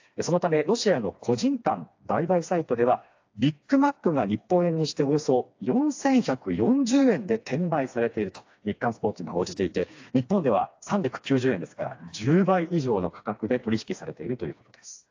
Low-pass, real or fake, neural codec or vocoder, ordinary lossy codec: 7.2 kHz; fake; codec, 16 kHz, 2 kbps, FreqCodec, smaller model; AAC, 48 kbps